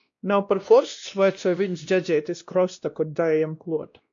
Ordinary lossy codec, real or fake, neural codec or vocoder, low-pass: AAC, 64 kbps; fake; codec, 16 kHz, 1 kbps, X-Codec, WavLM features, trained on Multilingual LibriSpeech; 7.2 kHz